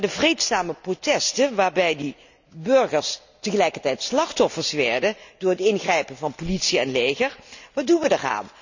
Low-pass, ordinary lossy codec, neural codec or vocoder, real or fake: 7.2 kHz; none; none; real